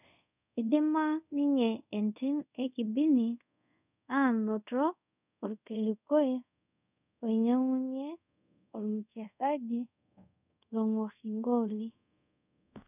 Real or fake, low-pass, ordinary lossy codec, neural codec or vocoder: fake; 3.6 kHz; none; codec, 24 kHz, 0.5 kbps, DualCodec